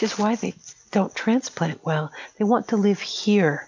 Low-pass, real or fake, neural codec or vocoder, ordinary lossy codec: 7.2 kHz; real; none; MP3, 64 kbps